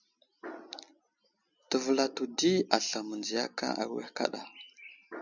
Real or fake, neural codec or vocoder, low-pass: real; none; 7.2 kHz